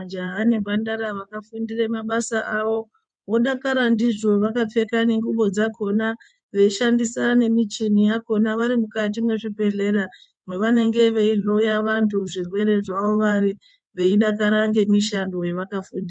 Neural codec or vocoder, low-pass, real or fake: codec, 16 kHz in and 24 kHz out, 2.2 kbps, FireRedTTS-2 codec; 9.9 kHz; fake